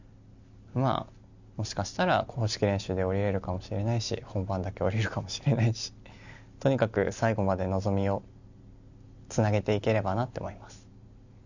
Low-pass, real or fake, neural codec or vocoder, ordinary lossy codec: 7.2 kHz; real; none; none